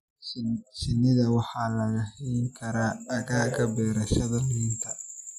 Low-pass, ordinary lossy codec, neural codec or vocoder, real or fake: 14.4 kHz; none; none; real